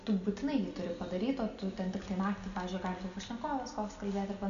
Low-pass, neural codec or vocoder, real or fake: 7.2 kHz; none; real